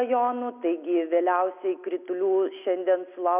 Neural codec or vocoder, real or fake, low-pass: none; real; 3.6 kHz